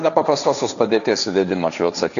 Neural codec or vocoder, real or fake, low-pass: codec, 16 kHz, 1.1 kbps, Voila-Tokenizer; fake; 7.2 kHz